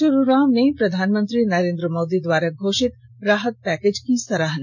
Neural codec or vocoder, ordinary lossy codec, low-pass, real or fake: none; none; 7.2 kHz; real